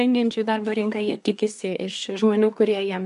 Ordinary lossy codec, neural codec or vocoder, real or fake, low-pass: MP3, 64 kbps; codec, 24 kHz, 1 kbps, SNAC; fake; 10.8 kHz